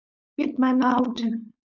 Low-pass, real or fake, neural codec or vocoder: 7.2 kHz; fake; codec, 16 kHz, 4.8 kbps, FACodec